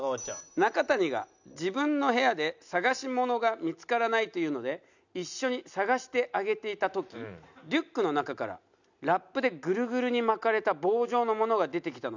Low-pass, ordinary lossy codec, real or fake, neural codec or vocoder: 7.2 kHz; none; real; none